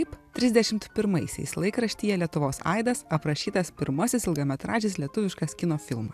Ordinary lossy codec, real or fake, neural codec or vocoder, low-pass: AAC, 96 kbps; real; none; 14.4 kHz